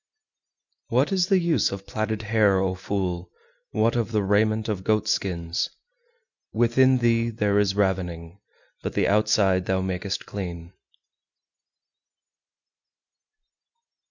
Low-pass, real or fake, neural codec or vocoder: 7.2 kHz; real; none